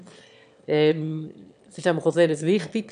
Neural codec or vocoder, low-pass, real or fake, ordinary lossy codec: autoencoder, 22.05 kHz, a latent of 192 numbers a frame, VITS, trained on one speaker; 9.9 kHz; fake; none